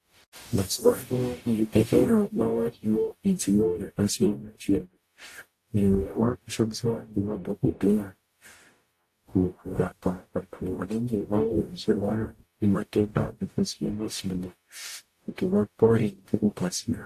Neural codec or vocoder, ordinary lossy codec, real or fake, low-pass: codec, 44.1 kHz, 0.9 kbps, DAC; AAC, 64 kbps; fake; 14.4 kHz